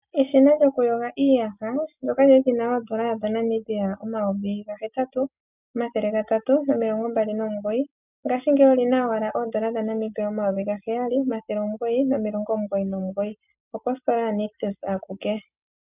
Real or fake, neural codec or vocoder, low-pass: real; none; 3.6 kHz